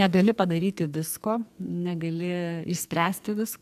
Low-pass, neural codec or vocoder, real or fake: 14.4 kHz; codec, 44.1 kHz, 2.6 kbps, SNAC; fake